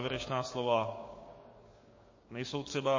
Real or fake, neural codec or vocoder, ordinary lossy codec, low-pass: fake; codec, 16 kHz, 6 kbps, DAC; MP3, 32 kbps; 7.2 kHz